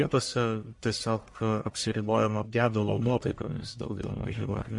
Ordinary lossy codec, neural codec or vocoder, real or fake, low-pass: MP3, 48 kbps; codec, 44.1 kHz, 1.7 kbps, Pupu-Codec; fake; 10.8 kHz